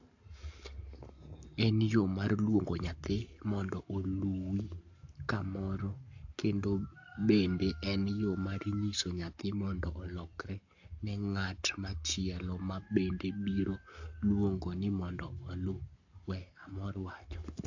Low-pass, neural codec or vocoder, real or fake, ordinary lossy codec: 7.2 kHz; codec, 44.1 kHz, 7.8 kbps, Pupu-Codec; fake; AAC, 48 kbps